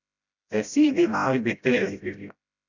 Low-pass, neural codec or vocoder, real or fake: 7.2 kHz; codec, 16 kHz, 0.5 kbps, FreqCodec, smaller model; fake